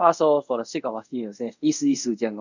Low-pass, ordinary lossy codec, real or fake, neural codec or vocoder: 7.2 kHz; none; fake; codec, 24 kHz, 0.5 kbps, DualCodec